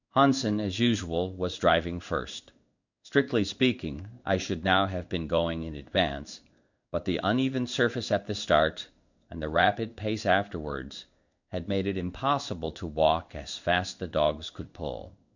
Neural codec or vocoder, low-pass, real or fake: codec, 16 kHz in and 24 kHz out, 1 kbps, XY-Tokenizer; 7.2 kHz; fake